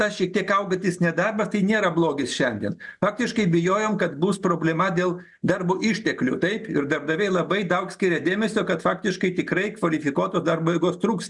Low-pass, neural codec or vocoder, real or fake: 10.8 kHz; none; real